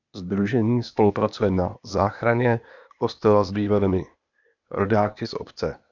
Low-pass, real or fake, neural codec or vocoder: 7.2 kHz; fake; codec, 16 kHz, 0.8 kbps, ZipCodec